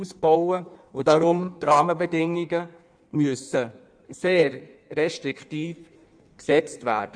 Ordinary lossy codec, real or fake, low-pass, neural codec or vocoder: none; fake; 9.9 kHz; codec, 16 kHz in and 24 kHz out, 1.1 kbps, FireRedTTS-2 codec